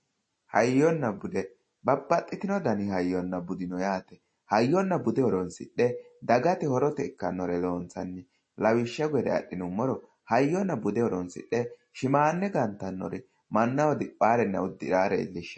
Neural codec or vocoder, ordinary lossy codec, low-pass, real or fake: none; MP3, 32 kbps; 9.9 kHz; real